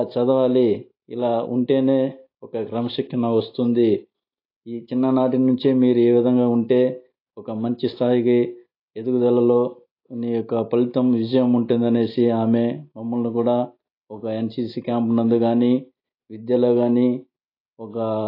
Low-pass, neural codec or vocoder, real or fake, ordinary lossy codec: 5.4 kHz; none; real; AAC, 32 kbps